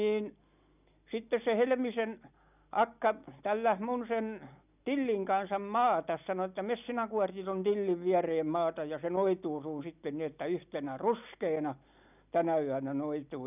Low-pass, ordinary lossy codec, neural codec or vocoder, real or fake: 3.6 kHz; none; none; real